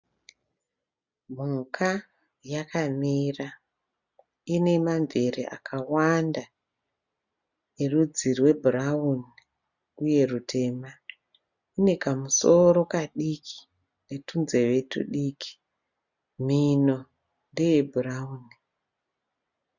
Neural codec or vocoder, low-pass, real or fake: none; 7.2 kHz; real